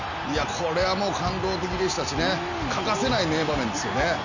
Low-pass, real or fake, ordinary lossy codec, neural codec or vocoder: 7.2 kHz; real; none; none